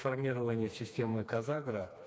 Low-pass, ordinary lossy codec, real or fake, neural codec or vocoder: none; none; fake; codec, 16 kHz, 2 kbps, FreqCodec, smaller model